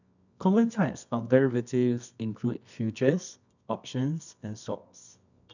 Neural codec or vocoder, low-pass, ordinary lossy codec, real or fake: codec, 24 kHz, 0.9 kbps, WavTokenizer, medium music audio release; 7.2 kHz; none; fake